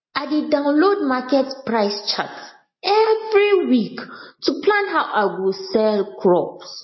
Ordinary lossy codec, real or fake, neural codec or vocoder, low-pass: MP3, 24 kbps; real; none; 7.2 kHz